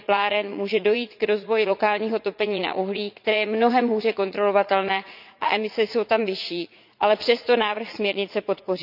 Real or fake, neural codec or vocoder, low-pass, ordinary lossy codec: fake; vocoder, 44.1 kHz, 80 mel bands, Vocos; 5.4 kHz; none